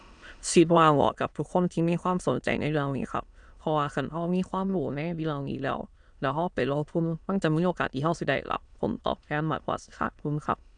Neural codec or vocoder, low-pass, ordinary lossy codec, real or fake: autoencoder, 22.05 kHz, a latent of 192 numbers a frame, VITS, trained on many speakers; 9.9 kHz; none; fake